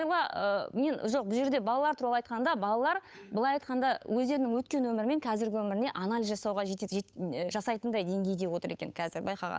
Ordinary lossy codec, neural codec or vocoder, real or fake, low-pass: none; codec, 16 kHz, 8 kbps, FunCodec, trained on Chinese and English, 25 frames a second; fake; none